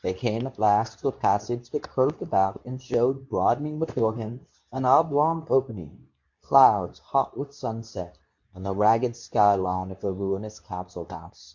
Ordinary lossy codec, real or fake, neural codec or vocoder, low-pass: MP3, 48 kbps; fake; codec, 24 kHz, 0.9 kbps, WavTokenizer, medium speech release version 2; 7.2 kHz